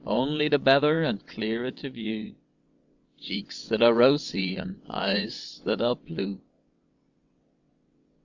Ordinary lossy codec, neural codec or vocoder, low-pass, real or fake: Opus, 64 kbps; vocoder, 22.05 kHz, 80 mel bands, WaveNeXt; 7.2 kHz; fake